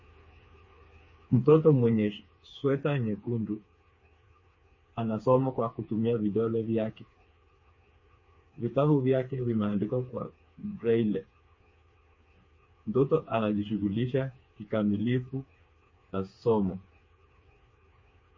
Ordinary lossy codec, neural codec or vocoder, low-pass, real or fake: MP3, 32 kbps; codec, 16 kHz, 4 kbps, FreqCodec, smaller model; 7.2 kHz; fake